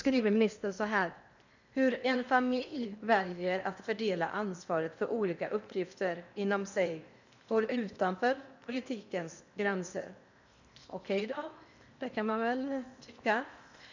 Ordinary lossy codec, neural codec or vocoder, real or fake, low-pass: none; codec, 16 kHz in and 24 kHz out, 0.8 kbps, FocalCodec, streaming, 65536 codes; fake; 7.2 kHz